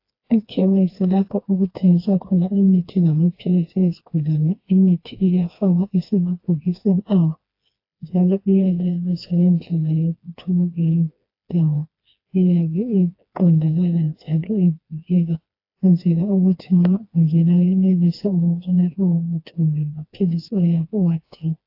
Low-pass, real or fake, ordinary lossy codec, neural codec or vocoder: 5.4 kHz; fake; AAC, 32 kbps; codec, 16 kHz, 2 kbps, FreqCodec, smaller model